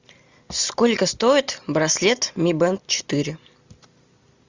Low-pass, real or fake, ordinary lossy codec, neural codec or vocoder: 7.2 kHz; real; Opus, 64 kbps; none